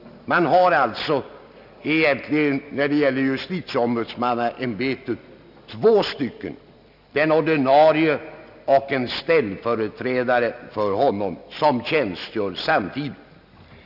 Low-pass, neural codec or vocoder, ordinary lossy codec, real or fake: 5.4 kHz; none; none; real